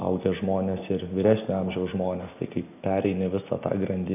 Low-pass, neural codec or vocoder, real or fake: 3.6 kHz; none; real